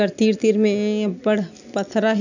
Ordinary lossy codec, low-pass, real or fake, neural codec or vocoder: none; 7.2 kHz; real; none